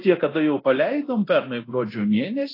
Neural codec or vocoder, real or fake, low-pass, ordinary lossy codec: codec, 24 kHz, 0.9 kbps, DualCodec; fake; 5.4 kHz; AAC, 24 kbps